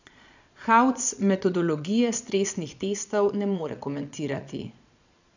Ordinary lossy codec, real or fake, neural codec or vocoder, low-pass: none; fake; vocoder, 22.05 kHz, 80 mel bands, Vocos; 7.2 kHz